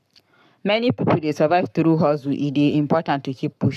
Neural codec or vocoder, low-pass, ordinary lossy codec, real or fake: codec, 44.1 kHz, 7.8 kbps, Pupu-Codec; 14.4 kHz; none; fake